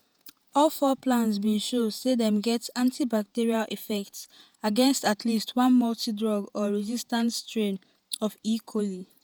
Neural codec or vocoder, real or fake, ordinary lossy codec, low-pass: vocoder, 48 kHz, 128 mel bands, Vocos; fake; none; none